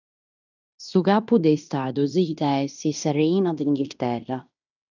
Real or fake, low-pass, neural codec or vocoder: fake; 7.2 kHz; codec, 16 kHz in and 24 kHz out, 0.9 kbps, LongCat-Audio-Codec, fine tuned four codebook decoder